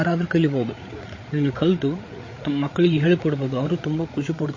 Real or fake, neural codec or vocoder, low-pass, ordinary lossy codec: fake; codec, 16 kHz, 8 kbps, FreqCodec, larger model; 7.2 kHz; MP3, 32 kbps